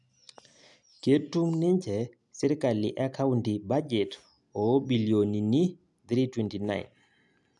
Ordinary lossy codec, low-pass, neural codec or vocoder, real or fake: none; 10.8 kHz; none; real